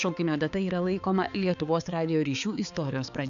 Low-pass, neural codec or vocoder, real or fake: 7.2 kHz; codec, 16 kHz, 4 kbps, X-Codec, HuBERT features, trained on balanced general audio; fake